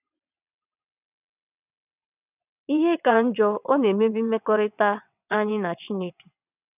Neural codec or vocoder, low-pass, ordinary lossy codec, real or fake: vocoder, 22.05 kHz, 80 mel bands, WaveNeXt; 3.6 kHz; none; fake